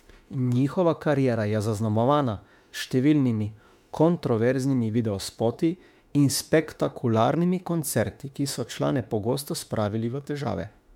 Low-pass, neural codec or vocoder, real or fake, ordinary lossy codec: 19.8 kHz; autoencoder, 48 kHz, 32 numbers a frame, DAC-VAE, trained on Japanese speech; fake; MP3, 96 kbps